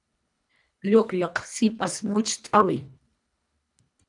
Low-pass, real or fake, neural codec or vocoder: 10.8 kHz; fake; codec, 24 kHz, 1.5 kbps, HILCodec